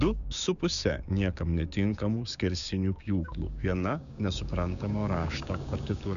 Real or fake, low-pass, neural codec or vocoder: fake; 7.2 kHz; codec, 16 kHz, 6 kbps, DAC